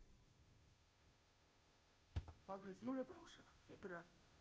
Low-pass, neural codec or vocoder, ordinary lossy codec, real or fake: none; codec, 16 kHz, 0.5 kbps, FunCodec, trained on Chinese and English, 25 frames a second; none; fake